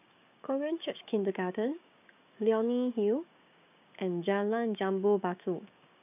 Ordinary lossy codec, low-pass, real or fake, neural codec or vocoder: none; 3.6 kHz; fake; codec, 16 kHz, 6 kbps, DAC